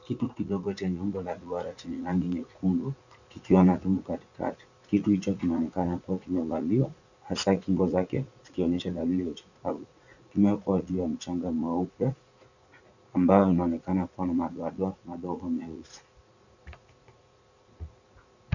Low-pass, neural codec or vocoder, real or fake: 7.2 kHz; vocoder, 44.1 kHz, 80 mel bands, Vocos; fake